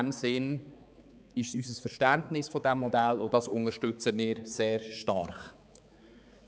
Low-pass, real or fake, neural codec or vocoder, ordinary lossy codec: none; fake; codec, 16 kHz, 4 kbps, X-Codec, HuBERT features, trained on balanced general audio; none